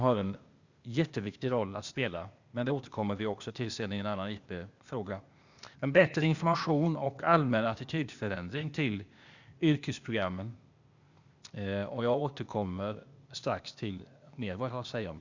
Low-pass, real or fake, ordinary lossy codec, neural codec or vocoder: 7.2 kHz; fake; Opus, 64 kbps; codec, 16 kHz, 0.8 kbps, ZipCodec